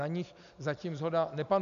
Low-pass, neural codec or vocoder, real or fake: 7.2 kHz; none; real